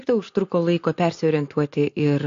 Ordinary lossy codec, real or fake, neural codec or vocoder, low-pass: AAC, 48 kbps; real; none; 7.2 kHz